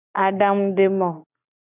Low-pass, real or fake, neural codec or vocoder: 3.6 kHz; real; none